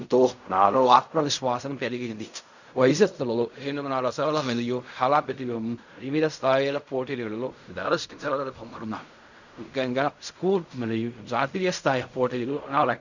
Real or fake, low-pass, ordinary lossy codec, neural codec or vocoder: fake; 7.2 kHz; none; codec, 16 kHz in and 24 kHz out, 0.4 kbps, LongCat-Audio-Codec, fine tuned four codebook decoder